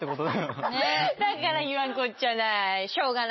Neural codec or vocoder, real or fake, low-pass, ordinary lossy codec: none; real; 7.2 kHz; MP3, 24 kbps